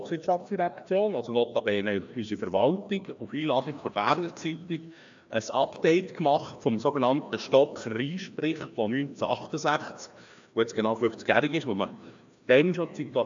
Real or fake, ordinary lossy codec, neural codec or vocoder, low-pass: fake; AAC, 64 kbps; codec, 16 kHz, 1 kbps, FreqCodec, larger model; 7.2 kHz